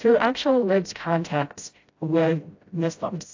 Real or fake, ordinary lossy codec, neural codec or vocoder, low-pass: fake; AAC, 48 kbps; codec, 16 kHz, 0.5 kbps, FreqCodec, smaller model; 7.2 kHz